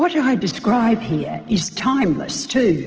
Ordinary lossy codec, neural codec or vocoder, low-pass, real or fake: Opus, 16 kbps; none; 7.2 kHz; real